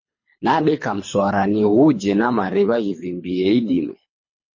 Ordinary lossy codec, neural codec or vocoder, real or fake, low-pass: MP3, 32 kbps; codec, 24 kHz, 3 kbps, HILCodec; fake; 7.2 kHz